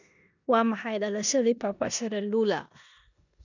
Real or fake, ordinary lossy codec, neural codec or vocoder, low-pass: fake; none; codec, 16 kHz in and 24 kHz out, 0.9 kbps, LongCat-Audio-Codec, four codebook decoder; 7.2 kHz